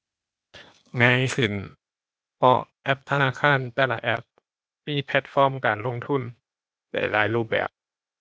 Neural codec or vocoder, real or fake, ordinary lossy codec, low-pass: codec, 16 kHz, 0.8 kbps, ZipCodec; fake; none; none